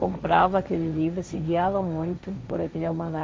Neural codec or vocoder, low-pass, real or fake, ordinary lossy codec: codec, 16 kHz, 1.1 kbps, Voila-Tokenizer; none; fake; none